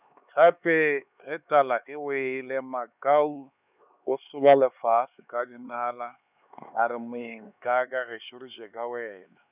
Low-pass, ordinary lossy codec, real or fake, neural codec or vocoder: 3.6 kHz; none; fake; codec, 16 kHz, 4 kbps, X-Codec, HuBERT features, trained on LibriSpeech